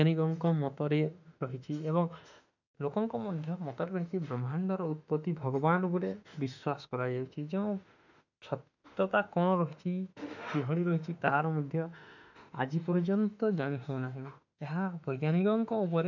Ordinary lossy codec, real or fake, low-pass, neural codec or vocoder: none; fake; 7.2 kHz; autoencoder, 48 kHz, 32 numbers a frame, DAC-VAE, trained on Japanese speech